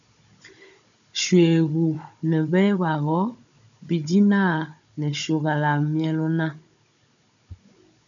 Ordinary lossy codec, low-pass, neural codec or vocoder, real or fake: MP3, 96 kbps; 7.2 kHz; codec, 16 kHz, 16 kbps, FunCodec, trained on Chinese and English, 50 frames a second; fake